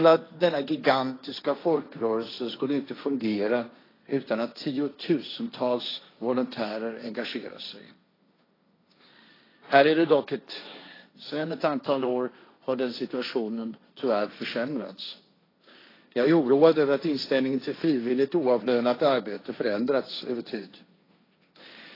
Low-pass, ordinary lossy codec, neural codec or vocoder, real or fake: 5.4 kHz; AAC, 24 kbps; codec, 16 kHz, 1.1 kbps, Voila-Tokenizer; fake